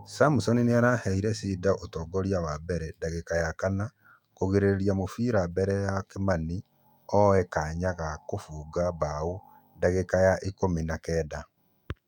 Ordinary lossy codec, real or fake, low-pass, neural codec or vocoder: none; fake; 19.8 kHz; autoencoder, 48 kHz, 128 numbers a frame, DAC-VAE, trained on Japanese speech